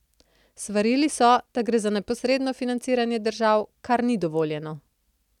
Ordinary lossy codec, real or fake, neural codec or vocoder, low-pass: none; real; none; 19.8 kHz